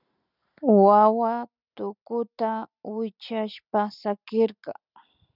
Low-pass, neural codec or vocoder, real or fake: 5.4 kHz; none; real